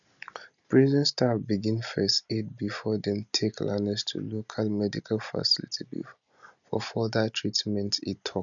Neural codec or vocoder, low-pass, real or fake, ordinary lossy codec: none; 7.2 kHz; real; none